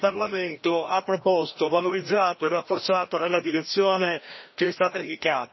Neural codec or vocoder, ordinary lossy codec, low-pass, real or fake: codec, 16 kHz, 1 kbps, FreqCodec, larger model; MP3, 24 kbps; 7.2 kHz; fake